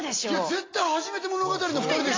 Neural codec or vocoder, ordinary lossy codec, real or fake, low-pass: none; AAC, 32 kbps; real; 7.2 kHz